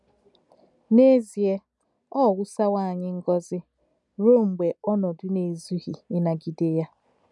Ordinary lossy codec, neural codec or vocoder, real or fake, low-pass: none; none; real; 10.8 kHz